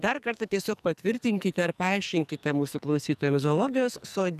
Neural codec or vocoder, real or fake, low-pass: codec, 44.1 kHz, 2.6 kbps, SNAC; fake; 14.4 kHz